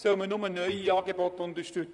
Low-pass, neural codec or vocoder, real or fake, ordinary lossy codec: 10.8 kHz; vocoder, 44.1 kHz, 128 mel bands, Pupu-Vocoder; fake; none